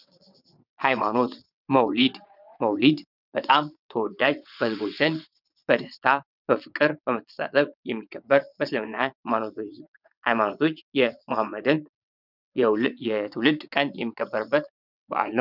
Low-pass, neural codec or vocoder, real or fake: 5.4 kHz; vocoder, 22.05 kHz, 80 mel bands, WaveNeXt; fake